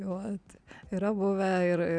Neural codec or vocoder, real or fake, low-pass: none; real; 9.9 kHz